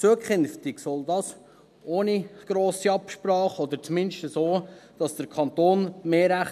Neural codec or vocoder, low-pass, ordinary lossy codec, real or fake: none; 14.4 kHz; none; real